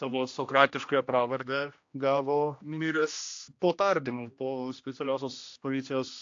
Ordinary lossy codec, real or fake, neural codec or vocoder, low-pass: AAC, 48 kbps; fake; codec, 16 kHz, 1 kbps, X-Codec, HuBERT features, trained on general audio; 7.2 kHz